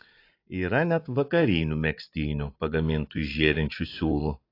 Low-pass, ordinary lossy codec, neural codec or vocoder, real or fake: 5.4 kHz; AAC, 32 kbps; vocoder, 44.1 kHz, 80 mel bands, Vocos; fake